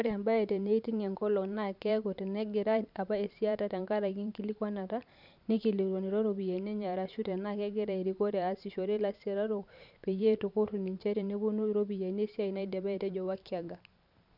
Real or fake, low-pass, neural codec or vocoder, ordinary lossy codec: fake; 5.4 kHz; vocoder, 44.1 kHz, 128 mel bands every 512 samples, BigVGAN v2; none